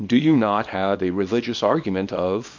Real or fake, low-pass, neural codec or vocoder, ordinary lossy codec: fake; 7.2 kHz; codec, 24 kHz, 0.9 kbps, WavTokenizer, small release; MP3, 48 kbps